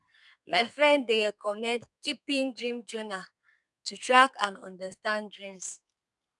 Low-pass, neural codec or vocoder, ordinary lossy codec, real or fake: 10.8 kHz; codec, 32 kHz, 1.9 kbps, SNAC; none; fake